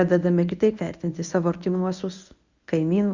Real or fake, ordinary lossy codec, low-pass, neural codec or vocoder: fake; Opus, 64 kbps; 7.2 kHz; codec, 24 kHz, 0.9 kbps, WavTokenizer, medium speech release version 1